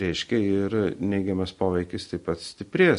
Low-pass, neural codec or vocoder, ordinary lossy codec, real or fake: 10.8 kHz; none; MP3, 48 kbps; real